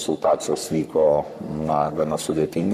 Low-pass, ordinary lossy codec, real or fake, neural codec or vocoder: 14.4 kHz; MP3, 96 kbps; fake; codec, 44.1 kHz, 3.4 kbps, Pupu-Codec